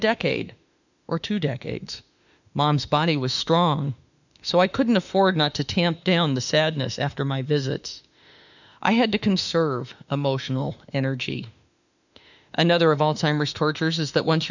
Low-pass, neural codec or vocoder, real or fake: 7.2 kHz; autoencoder, 48 kHz, 32 numbers a frame, DAC-VAE, trained on Japanese speech; fake